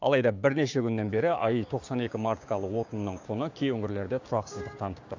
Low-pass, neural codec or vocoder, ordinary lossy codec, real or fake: 7.2 kHz; codec, 16 kHz, 6 kbps, DAC; AAC, 48 kbps; fake